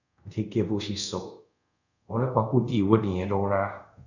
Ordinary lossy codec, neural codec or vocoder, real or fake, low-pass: none; codec, 24 kHz, 0.5 kbps, DualCodec; fake; 7.2 kHz